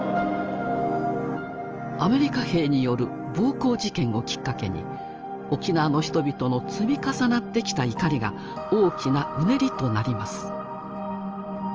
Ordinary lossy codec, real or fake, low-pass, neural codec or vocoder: Opus, 24 kbps; real; 7.2 kHz; none